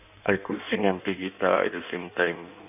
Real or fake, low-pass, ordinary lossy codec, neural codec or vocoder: fake; 3.6 kHz; none; codec, 16 kHz in and 24 kHz out, 1.1 kbps, FireRedTTS-2 codec